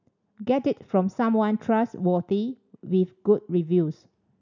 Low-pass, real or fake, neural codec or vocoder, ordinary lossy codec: 7.2 kHz; real; none; none